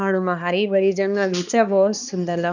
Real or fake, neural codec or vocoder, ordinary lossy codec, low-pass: fake; codec, 16 kHz, 2 kbps, X-Codec, HuBERT features, trained on balanced general audio; none; 7.2 kHz